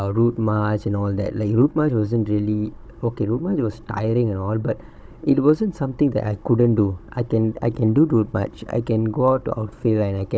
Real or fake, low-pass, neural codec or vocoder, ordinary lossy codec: fake; none; codec, 16 kHz, 16 kbps, FunCodec, trained on Chinese and English, 50 frames a second; none